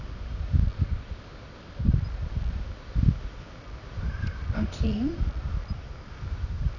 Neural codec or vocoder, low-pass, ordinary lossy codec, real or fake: codec, 24 kHz, 0.9 kbps, WavTokenizer, medium music audio release; 7.2 kHz; none; fake